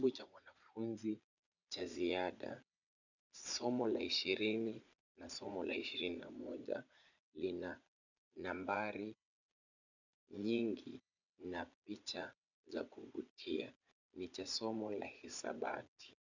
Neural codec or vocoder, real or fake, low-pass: vocoder, 22.05 kHz, 80 mel bands, Vocos; fake; 7.2 kHz